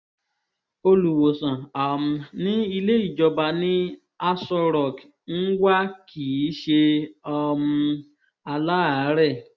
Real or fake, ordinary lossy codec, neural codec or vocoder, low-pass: real; none; none; none